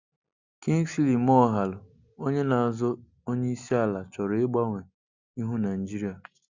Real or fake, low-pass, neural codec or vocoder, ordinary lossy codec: real; 7.2 kHz; none; Opus, 64 kbps